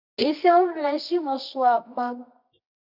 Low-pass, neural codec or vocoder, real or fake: 5.4 kHz; codec, 24 kHz, 0.9 kbps, WavTokenizer, medium music audio release; fake